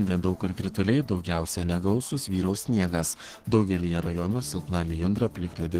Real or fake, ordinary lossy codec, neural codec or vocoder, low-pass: fake; Opus, 16 kbps; codec, 32 kHz, 1.9 kbps, SNAC; 14.4 kHz